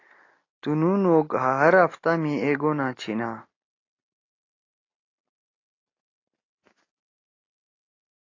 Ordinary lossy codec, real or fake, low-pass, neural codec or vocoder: AAC, 32 kbps; real; 7.2 kHz; none